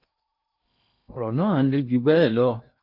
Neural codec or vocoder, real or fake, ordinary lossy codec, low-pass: codec, 16 kHz in and 24 kHz out, 0.8 kbps, FocalCodec, streaming, 65536 codes; fake; MP3, 48 kbps; 5.4 kHz